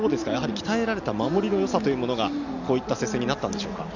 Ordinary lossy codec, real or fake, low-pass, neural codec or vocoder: none; real; 7.2 kHz; none